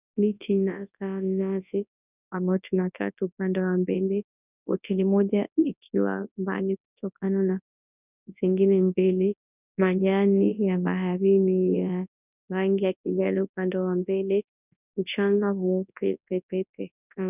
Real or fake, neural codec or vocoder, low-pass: fake; codec, 24 kHz, 0.9 kbps, WavTokenizer, large speech release; 3.6 kHz